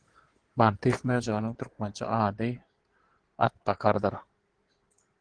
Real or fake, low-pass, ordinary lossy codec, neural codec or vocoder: fake; 9.9 kHz; Opus, 16 kbps; codec, 16 kHz in and 24 kHz out, 2.2 kbps, FireRedTTS-2 codec